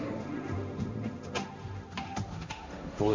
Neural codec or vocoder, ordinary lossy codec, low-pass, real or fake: codec, 16 kHz, 1.1 kbps, Voila-Tokenizer; MP3, 48 kbps; 7.2 kHz; fake